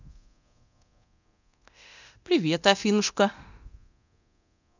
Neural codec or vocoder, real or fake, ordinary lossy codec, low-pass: codec, 24 kHz, 0.9 kbps, DualCodec; fake; none; 7.2 kHz